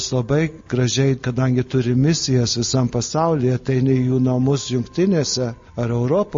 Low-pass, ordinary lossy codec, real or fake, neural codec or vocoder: 7.2 kHz; MP3, 32 kbps; real; none